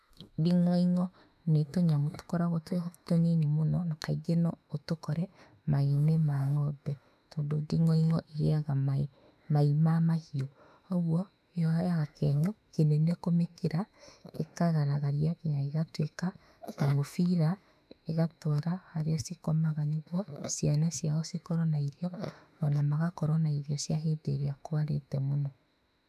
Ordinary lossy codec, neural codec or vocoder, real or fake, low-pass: none; autoencoder, 48 kHz, 32 numbers a frame, DAC-VAE, trained on Japanese speech; fake; 14.4 kHz